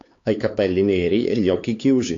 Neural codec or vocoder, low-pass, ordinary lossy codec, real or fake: codec, 16 kHz, 4 kbps, X-Codec, HuBERT features, trained on LibriSpeech; 7.2 kHz; AAC, 48 kbps; fake